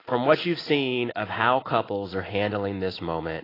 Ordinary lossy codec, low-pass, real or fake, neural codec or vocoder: AAC, 24 kbps; 5.4 kHz; real; none